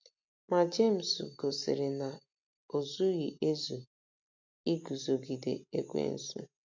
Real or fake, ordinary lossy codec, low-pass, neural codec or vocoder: real; MP3, 48 kbps; 7.2 kHz; none